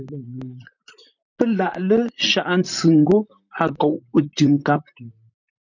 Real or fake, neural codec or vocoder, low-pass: fake; vocoder, 44.1 kHz, 128 mel bands, Pupu-Vocoder; 7.2 kHz